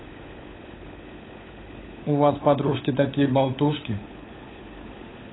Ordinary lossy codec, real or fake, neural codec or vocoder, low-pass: AAC, 16 kbps; fake; codec, 16 kHz, 16 kbps, FunCodec, trained on LibriTTS, 50 frames a second; 7.2 kHz